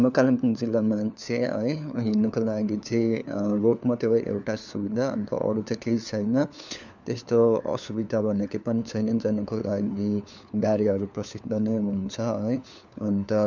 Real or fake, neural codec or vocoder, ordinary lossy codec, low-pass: fake; codec, 16 kHz, 4 kbps, FunCodec, trained on LibriTTS, 50 frames a second; none; 7.2 kHz